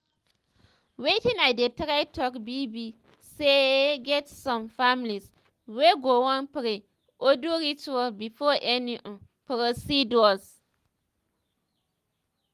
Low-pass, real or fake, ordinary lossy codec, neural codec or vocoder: 14.4 kHz; real; Opus, 24 kbps; none